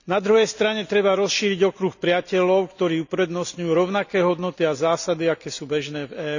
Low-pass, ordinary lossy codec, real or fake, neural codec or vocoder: 7.2 kHz; none; real; none